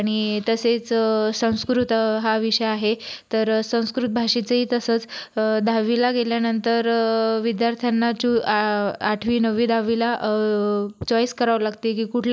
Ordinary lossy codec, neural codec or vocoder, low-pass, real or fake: none; none; none; real